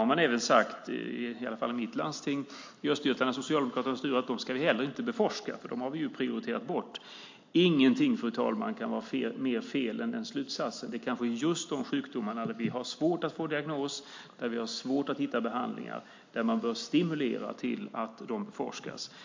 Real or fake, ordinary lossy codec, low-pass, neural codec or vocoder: real; MP3, 48 kbps; 7.2 kHz; none